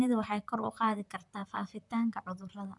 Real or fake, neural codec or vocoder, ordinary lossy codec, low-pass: fake; vocoder, 44.1 kHz, 128 mel bands, Pupu-Vocoder; none; 10.8 kHz